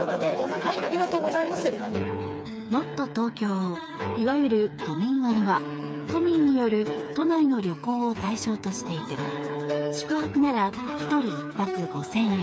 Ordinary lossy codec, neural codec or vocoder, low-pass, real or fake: none; codec, 16 kHz, 4 kbps, FreqCodec, smaller model; none; fake